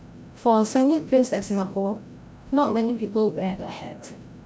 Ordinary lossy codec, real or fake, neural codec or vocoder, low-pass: none; fake; codec, 16 kHz, 0.5 kbps, FreqCodec, larger model; none